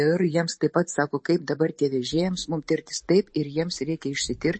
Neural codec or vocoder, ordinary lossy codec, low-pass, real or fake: vocoder, 44.1 kHz, 128 mel bands every 512 samples, BigVGAN v2; MP3, 32 kbps; 9.9 kHz; fake